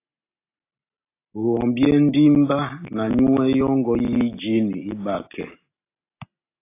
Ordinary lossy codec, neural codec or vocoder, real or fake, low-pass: AAC, 24 kbps; none; real; 3.6 kHz